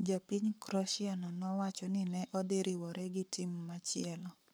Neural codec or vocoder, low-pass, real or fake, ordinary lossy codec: codec, 44.1 kHz, 7.8 kbps, Pupu-Codec; none; fake; none